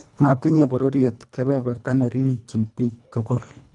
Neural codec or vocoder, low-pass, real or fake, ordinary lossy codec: codec, 24 kHz, 1.5 kbps, HILCodec; 10.8 kHz; fake; none